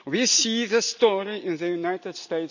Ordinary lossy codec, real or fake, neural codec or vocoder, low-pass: none; real; none; 7.2 kHz